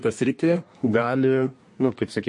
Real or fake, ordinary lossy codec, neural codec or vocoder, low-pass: fake; MP3, 48 kbps; codec, 24 kHz, 1 kbps, SNAC; 10.8 kHz